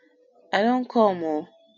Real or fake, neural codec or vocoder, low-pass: real; none; 7.2 kHz